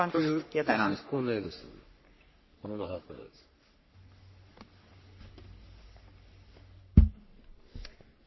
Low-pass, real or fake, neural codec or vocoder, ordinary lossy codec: 7.2 kHz; fake; codec, 44.1 kHz, 3.4 kbps, Pupu-Codec; MP3, 24 kbps